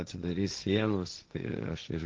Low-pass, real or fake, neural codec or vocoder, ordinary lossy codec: 7.2 kHz; fake; codec, 16 kHz, 1.1 kbps, Voila-Tokenizer; Opus, 24 kbps